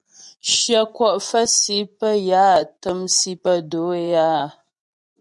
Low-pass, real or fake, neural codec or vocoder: 10.8 kHz; fake; vocoder, 24 kHz, 100 mel bands, Vocos